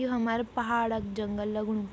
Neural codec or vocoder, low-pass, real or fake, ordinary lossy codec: none; none; real; none